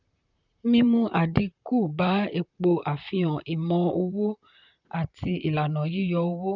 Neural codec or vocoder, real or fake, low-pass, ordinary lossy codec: vocoder, 44.1 kHz, 128 mel bands, Pupu-Vocoder; fake; 7.2 kHz; none